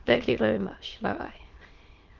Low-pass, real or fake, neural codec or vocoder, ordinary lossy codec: 7.2 kHz; fake; autoencoder, 22.05 kHz, a latent of 192 numbers a frame, VITS, trained on many speakers; Opus, 16 kbps